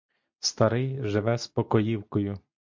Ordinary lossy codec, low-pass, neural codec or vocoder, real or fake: MP3, 48 kbps; 7.2 kHz; none; real